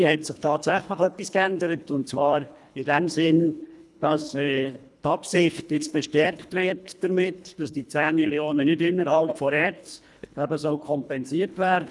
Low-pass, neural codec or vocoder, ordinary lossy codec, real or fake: none; codec, 24 kHz, 1.5 kbps, HILCodec; none; fake